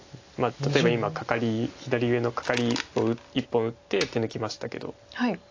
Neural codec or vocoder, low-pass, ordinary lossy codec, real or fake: none; 7.2 kHz; AAC, 48 kbps; real